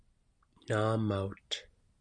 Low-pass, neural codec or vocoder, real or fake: 9.9 kHz; none; real